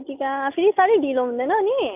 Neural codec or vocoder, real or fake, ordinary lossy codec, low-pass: none; real; none; 3.6 kHz